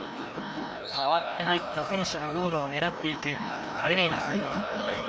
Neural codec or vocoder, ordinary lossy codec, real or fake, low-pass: codec, 16 kHz, 1 kbps, FreqCodec, larger model; none; fake; none